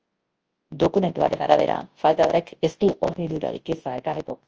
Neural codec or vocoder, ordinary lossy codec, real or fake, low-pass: codec, 24 kHz, 0.9 kbps, WavTokenizer, large speech release; Opus, 24 kbps; fake; 7.2 kHz